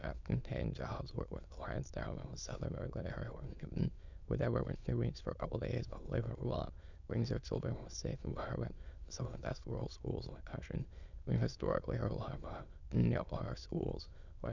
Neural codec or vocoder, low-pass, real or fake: autoencoder, 22.05 kHz, a latent of 192 numbers a frame, VITS, trained on many speakers; 7.2 kHz; fake